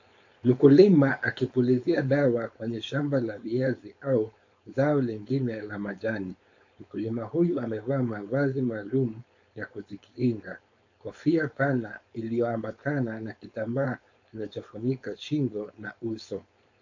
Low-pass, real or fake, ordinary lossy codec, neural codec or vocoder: 7.2 kHz; fake; AAC, 48 kbps; codec, 16 kHz, 4.8 kbps, FACodec